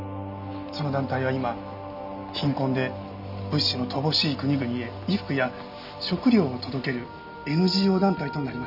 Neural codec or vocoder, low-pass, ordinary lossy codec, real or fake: none; 5.4 kHz; none; real